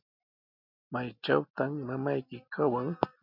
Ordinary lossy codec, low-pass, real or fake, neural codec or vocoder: MP3, 48 kbps; 5.4 kHz; real; none